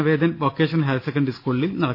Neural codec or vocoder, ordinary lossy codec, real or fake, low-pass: none; none; real; 5.4 kHz